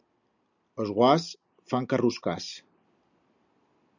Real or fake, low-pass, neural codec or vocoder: real; 7.2 kHz; none